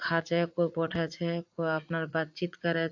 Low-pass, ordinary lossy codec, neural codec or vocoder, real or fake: 7.2 kHz; AAC, 48 kbps; vocoder, 44.1 kHz, 80 mel bands, Vocos; fake